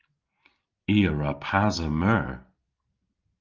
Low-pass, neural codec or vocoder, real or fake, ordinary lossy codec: 7.2 kHz; none; real; Opus, 32 kbps